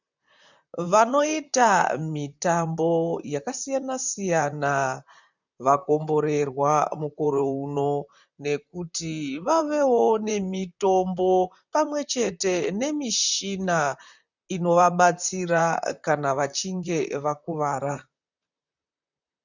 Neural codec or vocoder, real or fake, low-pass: vocoder, 44.1 kHz, 128 mel bands, Pupu-Vocoder; fake; 7.2 kHz